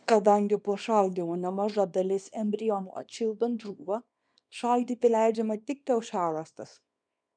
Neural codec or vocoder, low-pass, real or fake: codec, 24 kHz, 0.9 kbps, WavTokenizer, small release; 9.9 kHz; fake